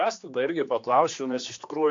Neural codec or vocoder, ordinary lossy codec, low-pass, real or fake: codec, 16 kHz, 2 kbps, X-Codec, HuBERT features, trained on general audio; AAC, 48 kbps; 7.2 kHz; fake